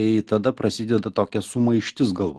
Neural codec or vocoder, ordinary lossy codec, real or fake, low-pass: none; Opus, 16 kbps; real; 10.8 kHz